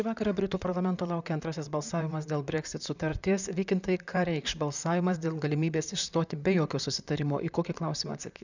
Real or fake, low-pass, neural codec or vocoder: fake; 7.2 kHz; vocoder, 44.1 kHz, 128 mel bands, Pupu-Vocoder